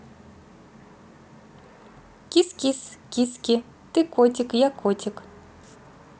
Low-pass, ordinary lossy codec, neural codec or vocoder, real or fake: none; none; none; real